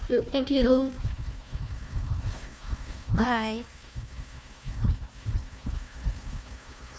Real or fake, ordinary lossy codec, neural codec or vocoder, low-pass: fake; none; codec, 16 kHz, 1 kbps, FunCodec, trained on Chinese and English, 50 frames a second; none